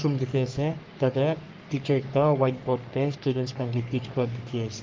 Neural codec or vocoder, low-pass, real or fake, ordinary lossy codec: codec, 44.1 kHz, 3.4 kbps, Pupu-Codec; 7.2 kHz; fake; Opus, 32 kbps